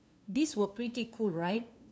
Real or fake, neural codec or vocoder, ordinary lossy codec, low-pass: fake; codec, 16 kHz, 2 kbps, FunCodec, trained on LibriTTS, 25 frames a second; none; none